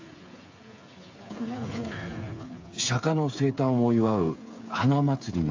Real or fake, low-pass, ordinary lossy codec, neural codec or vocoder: fake; 7.2 kHz; AAC, 48 kbps; codec, 16 kHz, 8 kbps, FreqCodec, smaller model